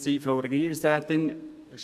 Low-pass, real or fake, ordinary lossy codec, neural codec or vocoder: 14.4 kHz; fake; none; codec, 32 kHz, 1.9 kbps, SNAC